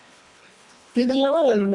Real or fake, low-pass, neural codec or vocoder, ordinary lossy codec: fake; 10.8 kHz; codec, 24 kHz, 1.5 kbps, HILCodec; Opus, 64 kbps